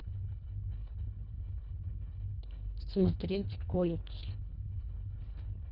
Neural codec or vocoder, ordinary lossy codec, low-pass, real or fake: codec, 24 kHz, 1.5 kbps, HILCodec; none; 5.4 kHz; fake